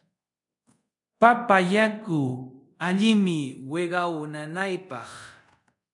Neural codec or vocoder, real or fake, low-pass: codec, 24 kHz, 0.5 kbps, DualCodec; fake; 10.8 kHz